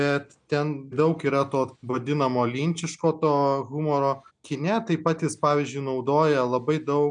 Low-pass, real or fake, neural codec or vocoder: 9.9 kHz; real; none